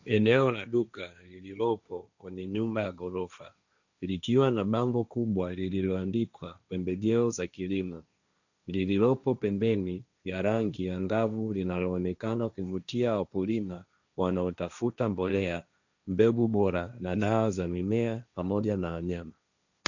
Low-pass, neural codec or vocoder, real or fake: 7.2 kHz; codec, 16 kHz, 1.1 kbps, Voila-Tokenizer; fake